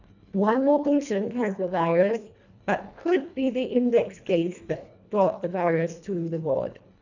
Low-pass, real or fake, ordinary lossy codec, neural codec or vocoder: 7.2 kHz; fake; none; codec, 24 kHz, 1.5 kbps, HILCodec